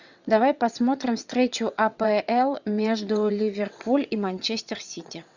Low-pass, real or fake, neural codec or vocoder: 7.2 kHz; fake; vocoder, 22.05 kHz, 80 mel bands, WaveNeXt